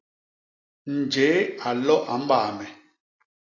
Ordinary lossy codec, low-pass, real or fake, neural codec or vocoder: AAC, 32 kbps; 7.2 kHz; real; none